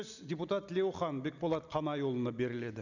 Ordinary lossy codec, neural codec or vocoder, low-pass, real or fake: none; none; 7.2 kHz; real